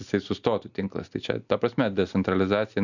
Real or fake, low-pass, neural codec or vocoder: real; 7.2 kHz; none